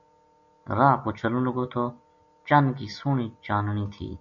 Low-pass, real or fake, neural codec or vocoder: 7.2 kHz; real; none